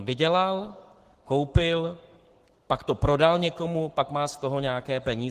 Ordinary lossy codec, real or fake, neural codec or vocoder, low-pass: Opus, 16 kbps; fake; codec, 44.1 kHz, 7.8 kbps, Pupu-Codec; 14.4 kHz